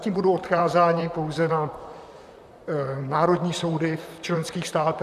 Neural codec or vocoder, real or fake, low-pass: vocoder, 44.1 kHz, 128 mel bands, Pupu-Vocoder; fake; 14.4 kHz